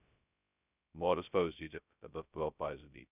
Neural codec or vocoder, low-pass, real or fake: codec, 16 kHz, 0.2 kbps, FocalCodec; 3.6 kHz; fake